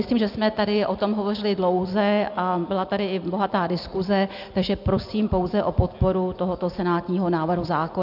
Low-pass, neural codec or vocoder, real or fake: 5.4 kHz; none; real